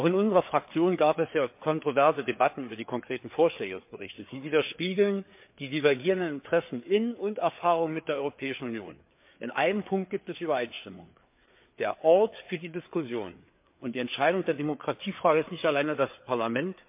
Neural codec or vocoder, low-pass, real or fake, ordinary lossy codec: codec, 16 kHz, 4 kbps, FreqCodec, larger model; 3.6 kHz; fake; MP3, 32 kbps